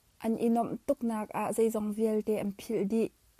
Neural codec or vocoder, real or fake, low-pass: none; real; 14.4 kHz